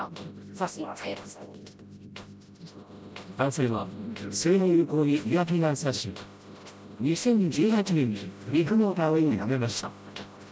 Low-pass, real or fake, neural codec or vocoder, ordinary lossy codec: none; fake; codec, 16 kHz, 0.5 kbps, FreqCodec, smaller model; none